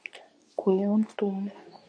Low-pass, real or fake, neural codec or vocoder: 9.9 kHz; fake; codec, 24 kHz, 0.9 kbps, WavTokenizer, medium speech release version 2